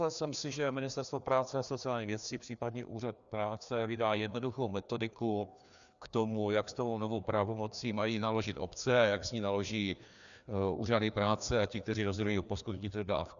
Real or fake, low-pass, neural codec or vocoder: fake; 7.2 kHz; codec, 16 kHz, 2 kbps, FreqCodec, larger model